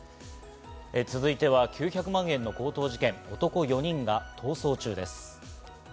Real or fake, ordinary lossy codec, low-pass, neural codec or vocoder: real; none; none; none